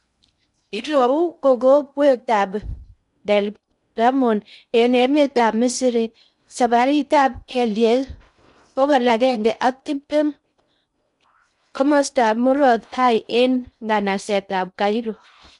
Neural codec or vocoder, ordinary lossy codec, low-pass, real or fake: codec, 16 kHz in and 24 kHz out, 0.6 kbps, FocalCodec, streaming, 2048 codes; none; 10.8 kHz; fake